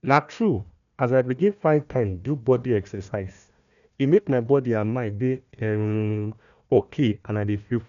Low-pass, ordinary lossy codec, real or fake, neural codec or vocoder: 7.2 kHz; none; fake; codec, 16 kHz, 1 kbps, FunCodec, trained on Chinese and English, 50 frames a second